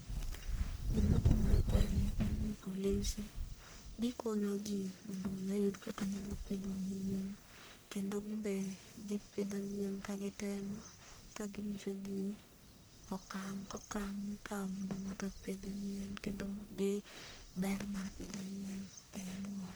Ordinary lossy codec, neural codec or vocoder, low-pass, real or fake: none; codec, 44.1 kHz, 1.7 kbps, Pupu-Codec; none; fake